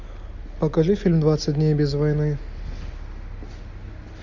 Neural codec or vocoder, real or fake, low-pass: none; real; 7.2 kHz